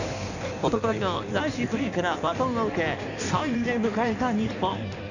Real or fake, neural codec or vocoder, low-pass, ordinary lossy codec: fake; codec, 16 kHz in and 24 kHz out, 1.1 kbps, FireRedTTS-2 codec; 7.2 kHz; none